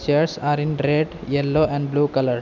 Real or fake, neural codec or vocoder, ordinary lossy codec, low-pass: real; none; none; 7.2 kHz